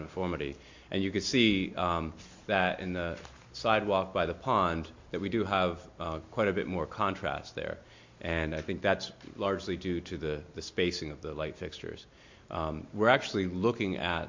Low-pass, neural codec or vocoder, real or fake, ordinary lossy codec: 7.2 kHz; none; real; MP3, 48 kbps